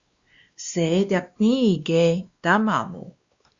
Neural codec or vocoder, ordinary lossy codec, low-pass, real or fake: codec, 16 kHz, 2 kbps, X-Codec, WavLM features, trained on Multilingual LibriSpeech; Opus, 64 kbps; 7.2 kHz; fake